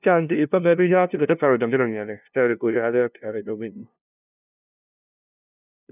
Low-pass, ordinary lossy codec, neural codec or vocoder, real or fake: 3.6 kHz; none; codec, 16 kHz, 0.5 kbps, FunCodec, trained on LibriTTS, 25 frames a second; fake